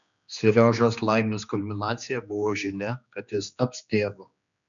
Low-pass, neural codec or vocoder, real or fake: 7.2 kHz; codec, 16 kHz, 2 kbps, X-Codec, HuBERT features, trained on general audio; fake